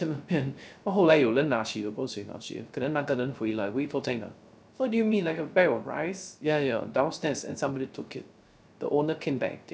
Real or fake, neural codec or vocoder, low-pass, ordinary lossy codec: fake; codec, 16 kHz, 0.3 kbps, FocalCodec; none; none